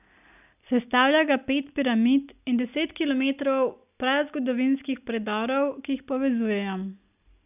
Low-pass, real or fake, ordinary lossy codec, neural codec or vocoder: 3.6 kHz; real; none; none